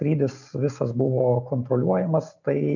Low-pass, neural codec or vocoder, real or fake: 7.2 kHz; none; real